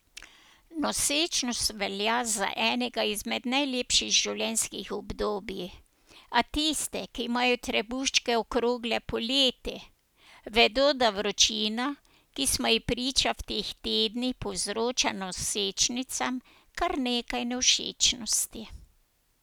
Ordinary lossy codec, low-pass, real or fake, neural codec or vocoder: none; none; real; none